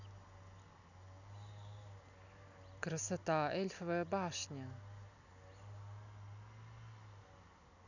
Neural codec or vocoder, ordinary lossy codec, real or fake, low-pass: none; none; real; 7.2 kHz